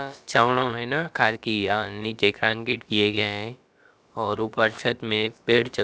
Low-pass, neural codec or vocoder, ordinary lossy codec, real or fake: none; codec, 16 kHz, about 1 kbps, DyCAST, with the encoder's durations; none; fake